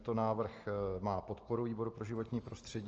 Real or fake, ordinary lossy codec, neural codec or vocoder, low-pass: real; Opus, 24 kbps; none; 7.2 kHz